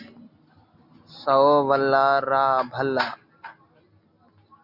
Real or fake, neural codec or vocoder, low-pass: real; none; 5.4 kHz